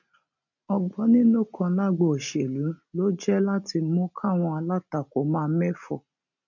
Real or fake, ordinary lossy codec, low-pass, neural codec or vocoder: real; none; 7.2 kHz; none